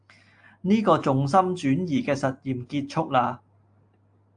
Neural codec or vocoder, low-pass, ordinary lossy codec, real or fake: none; 9.9 kHz; AAC, 64 kbps; real